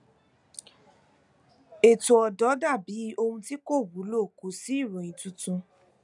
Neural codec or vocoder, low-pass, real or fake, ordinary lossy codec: none; 10.8 kHz; real; none